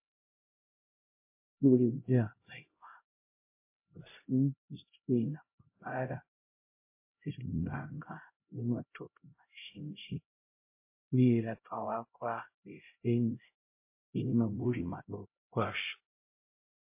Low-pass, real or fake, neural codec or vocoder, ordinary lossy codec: 3.6 kHz; fake; codec, 16 kHz, 0.5 kbps, X-Codec, HuBERT features, trained on LibriSpeech; MP3, 24 kbps